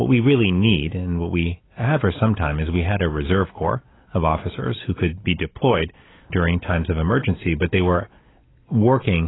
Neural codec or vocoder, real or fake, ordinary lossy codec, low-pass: none; real; AAC, 16 kbps; 7.2 kHz